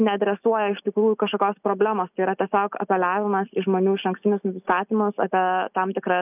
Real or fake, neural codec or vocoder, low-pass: real; none; 3.6 kHz